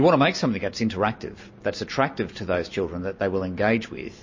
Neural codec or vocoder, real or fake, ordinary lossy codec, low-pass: none; real; MP3, 32 kbps; 7.2 kHz